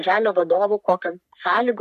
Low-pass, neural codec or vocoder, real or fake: 14.4 kHz; codec, 44.1 kHz, 3.4 kbps, Pupu-Codec; fake